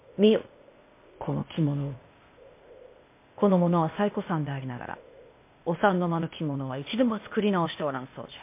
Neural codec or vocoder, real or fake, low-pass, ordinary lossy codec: codec, 16 kHz, 0.8 kbps, ZipCodec; fake; 3.6 kHz; MP3, 24 kbps